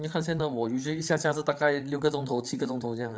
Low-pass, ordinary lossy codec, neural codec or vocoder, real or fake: none; none; codec, 16 kHz, 8 kbps, FreqCodec, larger model; fake